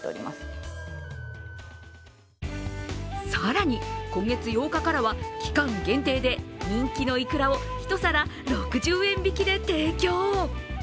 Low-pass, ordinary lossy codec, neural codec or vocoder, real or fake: none; none; none; real